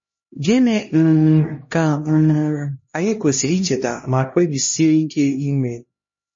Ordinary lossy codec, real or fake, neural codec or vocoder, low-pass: MP3, 32 kbps; fake; codec, 16 kHz, 1 kbps, X-Codec, HuBERT features, trained on LibriSpeech; 7.2 kHz